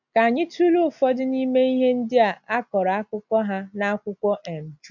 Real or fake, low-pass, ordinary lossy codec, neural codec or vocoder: real; 7.2 kHz; none; none